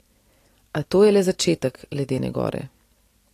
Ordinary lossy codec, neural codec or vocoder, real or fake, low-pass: AAC, 48 kbps; none; real; 14.4 kHz